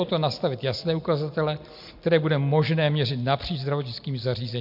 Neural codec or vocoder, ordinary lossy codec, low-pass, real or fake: none; MP3, 48 kbps; 5.4 kHz; real